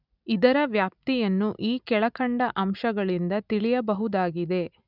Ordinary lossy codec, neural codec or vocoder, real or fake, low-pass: none; none; real; 5.4 kHz